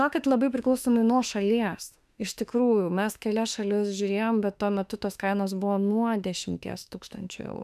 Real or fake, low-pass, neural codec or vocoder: fake; 14.4 kHz; autoencoder, 48 kHz, 32 numbers a frame, DAC-VAE, trained on Japanese speech